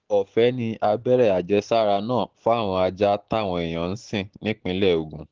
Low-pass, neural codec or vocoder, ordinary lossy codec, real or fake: 7.2 kHz; none; Opus, 16 kbps; real